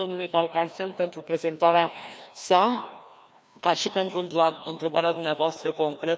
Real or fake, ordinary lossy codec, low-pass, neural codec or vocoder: fake; none; none; codec, 16 kHz, 1 kbps, FreqCodec, larger model